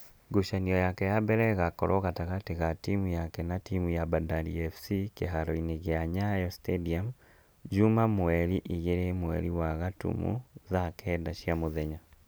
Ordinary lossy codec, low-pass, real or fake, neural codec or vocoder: none; none; real; none